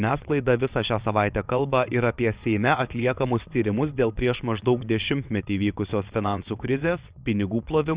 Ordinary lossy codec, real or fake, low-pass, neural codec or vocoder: Opus, 64 kbps; fake; 3.6 kHz; vocoder, 22.05 kHz, 80 mel bands, WaveNeXt